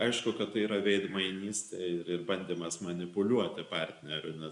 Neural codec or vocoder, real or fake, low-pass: none; real; 10.8 kHz